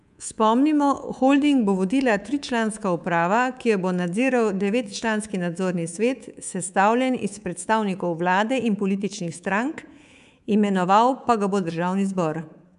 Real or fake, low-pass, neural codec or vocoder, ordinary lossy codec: fake; 10.8 kHz; codec, 24 kHz, 3.1 kbps, DualCodec; none